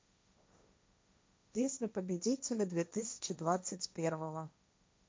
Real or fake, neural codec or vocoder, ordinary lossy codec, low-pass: fake; codec, 16 kHz, 1.1 kbps, Voila-Tokenizer; none; none